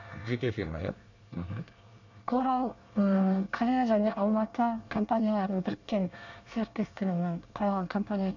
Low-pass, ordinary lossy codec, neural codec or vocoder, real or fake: 7.2 kHz; none; codec, 24 kHz, 1 kbps, SNAC; fake